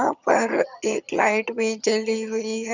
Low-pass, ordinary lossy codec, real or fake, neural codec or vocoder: 7.2 kHz; none; fake; vocoder, 22.05 kHz, 80 mel bands, HiFi-GAN